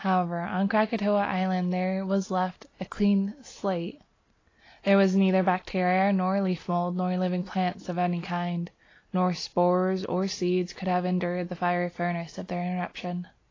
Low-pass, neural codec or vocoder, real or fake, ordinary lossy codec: 7.2 kHz; none; real; AAC, 32 kbps